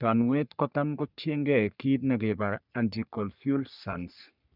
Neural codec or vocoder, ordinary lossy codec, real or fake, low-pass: codec, 44.1 kHz, 3.4 kbps, Pupu-Codec; none; fake; 5.4 kHz